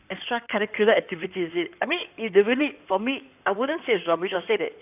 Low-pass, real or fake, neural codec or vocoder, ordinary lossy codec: 3.6 kHz; fake; codec, 16 kHz in and 24 kHz out, 2.2 kbps, FireRedTTS-2 codec; none